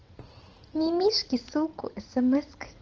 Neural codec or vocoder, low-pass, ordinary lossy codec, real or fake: vocoder, 44.1 kHz, 80 mel bands, Vocos; 7.2 kHz; Opus, 16 kbps; fake